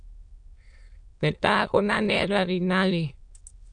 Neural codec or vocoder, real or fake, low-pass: autoencoder, 22.05 kHz, a latent of 192 numbers a frame, VITS, trained on many speakers; fake; 9.9 kHz